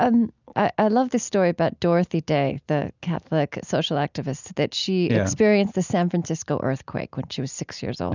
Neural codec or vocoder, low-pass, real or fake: none; 7.2 kHz; real